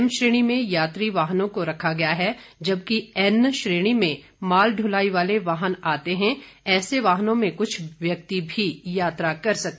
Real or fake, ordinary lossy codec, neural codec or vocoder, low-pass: real; none; none; none